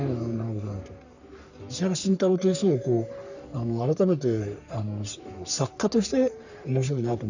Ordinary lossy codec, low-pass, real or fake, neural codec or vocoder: none; 7.2 kHz; fake; codec, 44.1 kHz, 3.4 kbps, Pupu-Codec